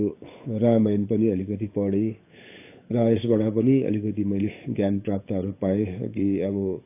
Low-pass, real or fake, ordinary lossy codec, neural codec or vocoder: 3.6 kHz; fake; AAC, 24 kbps; codec, 16 kHz, 8 kbps, FunCodec, trained on Chinese and English, 25 frames a second